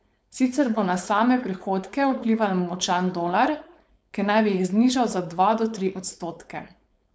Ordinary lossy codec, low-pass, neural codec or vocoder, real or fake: none; none; codec, 16 kHz, 4.8 kbps, FACodec; fake